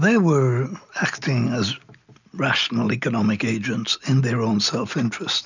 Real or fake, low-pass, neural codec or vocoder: real; 7.2 kHz; none